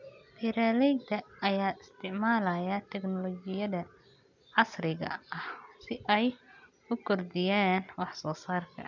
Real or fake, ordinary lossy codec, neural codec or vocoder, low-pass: real; none; none; 7.2 kHz